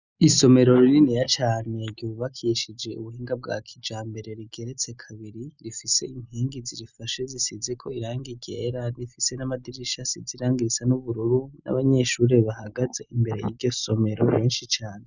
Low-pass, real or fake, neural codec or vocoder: 7.2 kHz; real; none